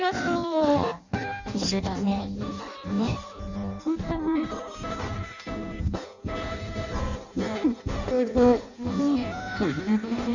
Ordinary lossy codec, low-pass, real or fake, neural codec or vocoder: none; 7.2 kHz; fake; codec, 16 kHz in and 24 kHz out, 0.6 kbps, FireRedTTS-2 codec